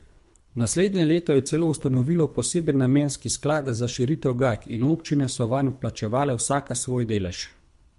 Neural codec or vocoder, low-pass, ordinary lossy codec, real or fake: codec, 24 kHz, 3 kbps, HILCodec; 10.8 kHz; MP3, 64 kbps; fake